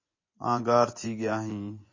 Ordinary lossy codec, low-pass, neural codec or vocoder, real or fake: MP3, 32 kbps; 7.2 kHz; vocoder, 24 kHz, 100 mel bands, Vocos; fake